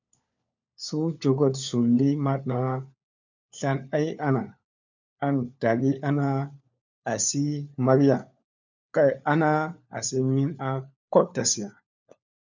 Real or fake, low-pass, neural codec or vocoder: fake; 7.2 kHz; codec, 16 kHz, 4 kbps, FunCodec, trained on LibriTTS, 50 frames a second